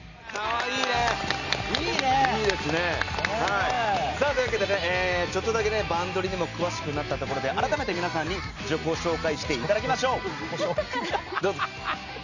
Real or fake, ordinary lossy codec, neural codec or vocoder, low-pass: real; none; none; 7.2 kHz